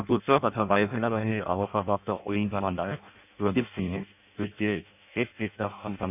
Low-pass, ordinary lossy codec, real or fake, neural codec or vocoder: 3.6 kHz; none; fake; codec, 16 kHz in and 24 kHz out, 0.6 kbps, FireRedTTS-2 codec